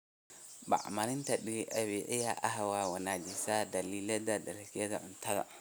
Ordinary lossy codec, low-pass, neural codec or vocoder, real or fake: none; none; none; real